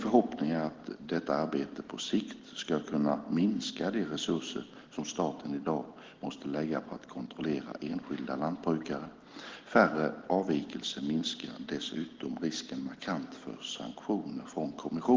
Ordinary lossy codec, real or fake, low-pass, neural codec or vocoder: Opus, 16 kbps; real; 7.2 kHz; none